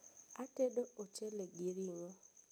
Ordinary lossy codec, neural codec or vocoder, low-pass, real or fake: none; none; none; real